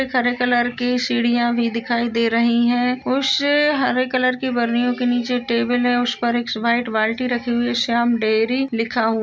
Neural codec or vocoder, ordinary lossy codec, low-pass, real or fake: none; none; none; real